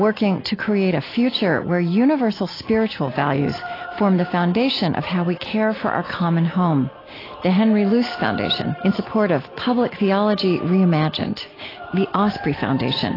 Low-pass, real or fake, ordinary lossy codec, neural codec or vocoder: 5.4 kHz; real; AAC, 24 kbps; none